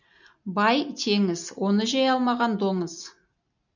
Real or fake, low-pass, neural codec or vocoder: real; 7.2 kHz; none